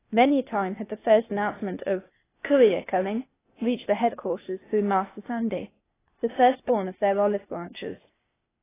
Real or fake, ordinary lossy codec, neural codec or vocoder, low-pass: fake; AAC, 16 kbps; codec, 16 kHz, 0.8 kbps, ZipCodec; 3.6 kHz